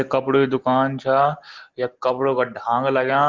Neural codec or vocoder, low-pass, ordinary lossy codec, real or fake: none; 7.2 kHz; Opus, 16 kbps; real